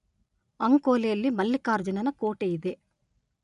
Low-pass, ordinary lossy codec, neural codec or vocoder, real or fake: 10.8 kHz; none; none; real